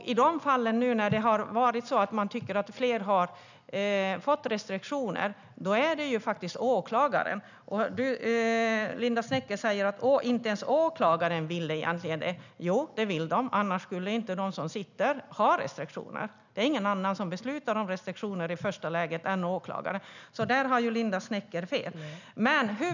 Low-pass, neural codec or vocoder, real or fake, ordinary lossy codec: 7.2 kHz; none; real; none